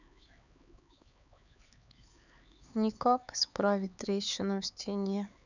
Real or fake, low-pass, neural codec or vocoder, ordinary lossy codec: fake; 7.2 kHz; codec, 16 kHz, 4 kbps, X-Codec, HuBERT features, trained on LibriSpeech; none